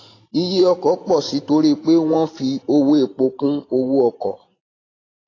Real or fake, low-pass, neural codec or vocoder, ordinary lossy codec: fake; 7.2 kHz; vocoder, 44.1 kHz, 128 mel bands every 512 samples, BigVGAN v2; AAC, 32 kbps